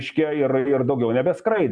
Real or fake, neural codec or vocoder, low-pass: real; none; 9.9 kHz